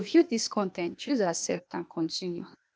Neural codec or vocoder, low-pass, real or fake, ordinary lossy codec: codec, 16 kHz, 0.8 kbps, ZipCodec; none; fake; none